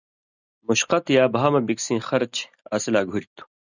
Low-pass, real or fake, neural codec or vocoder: 7.2 kHz; real; none